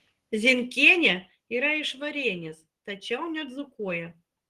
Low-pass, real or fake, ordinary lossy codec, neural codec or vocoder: 14.4 kHz; real; Opus, 16 kbps; none